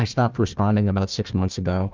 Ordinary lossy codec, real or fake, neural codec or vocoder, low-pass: Opus, 24 kbps; fake; codec, 16 kHz, 1 kbps, FunCodec, trained on Chinese and English, 50 frames a second; 7.2 kHz